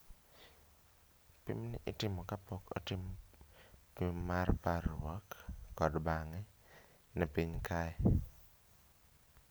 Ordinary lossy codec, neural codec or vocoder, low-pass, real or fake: none; none; none; real